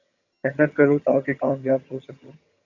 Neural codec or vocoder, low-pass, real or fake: vocoder, 22.05 kHz, 80 mel bands, HiFi-GAN; 7.2 kHz; fake